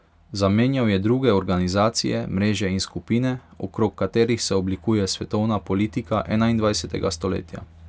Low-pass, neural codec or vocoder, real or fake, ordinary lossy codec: none; none; real; none